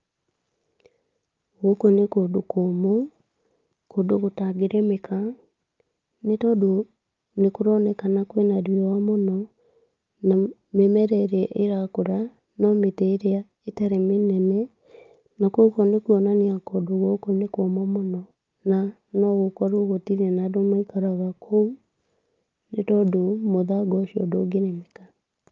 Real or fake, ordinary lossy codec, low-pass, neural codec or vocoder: real; Opus, 32 kbps; 7.2 kHz; none